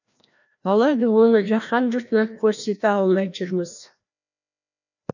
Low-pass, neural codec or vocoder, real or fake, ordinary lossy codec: 7.2 kHz; codec, 16 kHz, 1 kbps, FreqCodec, larger model; fake; none